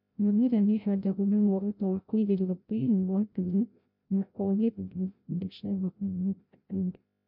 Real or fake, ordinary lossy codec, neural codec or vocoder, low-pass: fake; none; codec, 16 kHz, 0.5 kbps, FreqCodec, larger model; 5.4 kHz